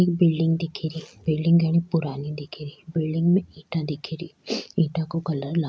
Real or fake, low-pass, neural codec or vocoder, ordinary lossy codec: real; none; none; none